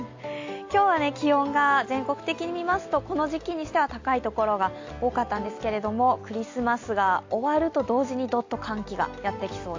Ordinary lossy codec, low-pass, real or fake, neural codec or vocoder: AAC, 48 kbps; 7.2 kHz; real; none